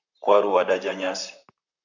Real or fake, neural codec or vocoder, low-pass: fake; vocoder, 44.1 kHz, 128 mel bands, Pupu-Vocoder; 7.2 kHz